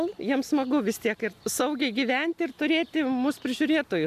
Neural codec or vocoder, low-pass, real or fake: none; 14.4 kHz; real